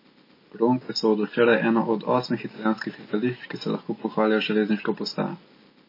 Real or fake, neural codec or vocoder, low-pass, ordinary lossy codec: real; none; 5.4 kHz; MP3, 24 kbps